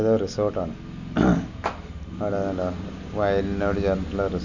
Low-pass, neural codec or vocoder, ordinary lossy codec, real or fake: 7.2 kHz; none; none; real